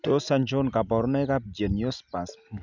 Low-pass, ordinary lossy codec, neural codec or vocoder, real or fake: 7.2 kHz; none; none; real